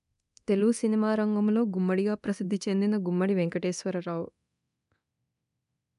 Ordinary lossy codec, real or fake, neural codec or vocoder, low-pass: none; fake; codec, 24 kHz, 0.9 kbps, DualCodec; 10.8 kHz